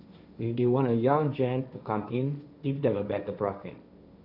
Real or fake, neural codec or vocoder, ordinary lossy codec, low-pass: fake; codec, 16 kHz, 1.1 kbps, Voila-Tokenizer; none; 5.4 kHz